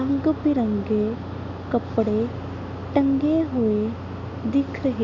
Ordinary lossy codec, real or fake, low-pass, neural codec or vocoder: none; real; 7.2 kHz; none